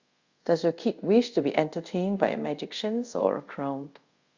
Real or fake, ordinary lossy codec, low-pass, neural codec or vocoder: fake; Opus, 64 kbps; 7.2 kHz; codec, 24 kHz, 0.5 kbps, DualCodec